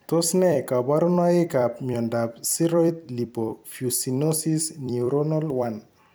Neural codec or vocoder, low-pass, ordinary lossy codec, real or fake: vocoder, 44.1 kHz, 128 mel bands every 256 samples, BigVGAN v2; none; none; fake